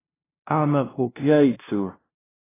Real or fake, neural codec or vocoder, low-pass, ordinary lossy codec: fake; codec, 16 kHz, 0.5 kbps, FunCodec, trained on LibriTTS, 25 frames a second; 3.6 kHz; AAC, 16 kbps